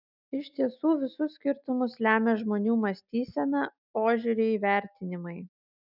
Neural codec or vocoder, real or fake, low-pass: none; real; 5.4 kHz